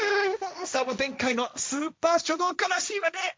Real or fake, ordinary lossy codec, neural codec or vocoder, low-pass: fake; none; codec, 16 kHz, 1.1 kbps, Voila-Tokenizer; none